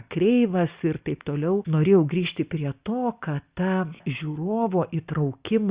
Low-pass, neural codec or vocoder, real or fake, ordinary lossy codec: 3.6 kHz; none; real; Opus, 64 kbps